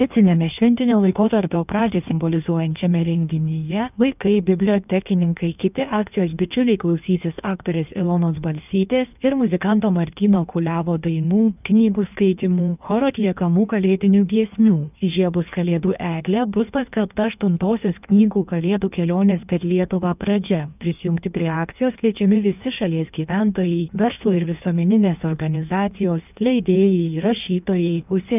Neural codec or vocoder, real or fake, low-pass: codec, 16 kHz in and 24 kHz out, 1.1 kbps, FireRedTTS-2 codec; fake; 3.6 kHz